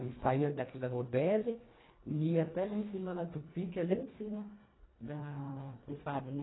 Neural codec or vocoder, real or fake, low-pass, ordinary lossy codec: codec, 24 kHz, 1.5 kbps, HILCodec; fake; 7.2 kHz; AAC, 16 kbps